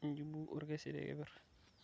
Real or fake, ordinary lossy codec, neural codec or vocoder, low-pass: real; none; none; none